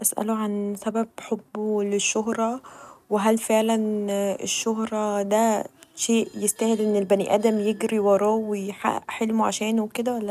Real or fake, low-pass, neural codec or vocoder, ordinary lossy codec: real; 14.4 kHz; none; none